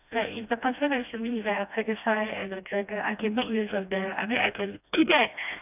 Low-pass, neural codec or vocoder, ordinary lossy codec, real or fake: 3.6 kHz; codec, 16 kHz, 1 kbps, FreqCodec, smaller model; none; fake